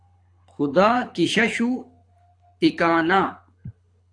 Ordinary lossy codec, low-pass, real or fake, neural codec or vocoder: AAC, 48 kbps; 9.9 kHz; fake; codec, 24 kHz, 6 kbps, HILCodec